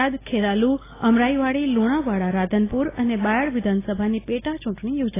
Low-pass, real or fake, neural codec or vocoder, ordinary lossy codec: 3.6 kHz; real; none; AAC, 16 kbps